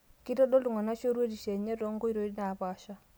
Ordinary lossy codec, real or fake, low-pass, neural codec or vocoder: none; real; none; none